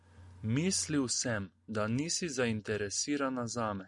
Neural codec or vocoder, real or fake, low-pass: none; real; 10.8 kHz